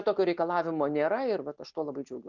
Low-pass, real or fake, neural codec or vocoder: 7.2 kHz; real; none